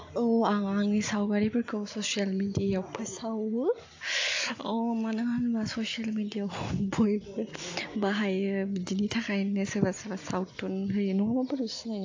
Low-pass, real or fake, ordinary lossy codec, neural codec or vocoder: 7.2 kHz; real; AAC, 48 kbps; none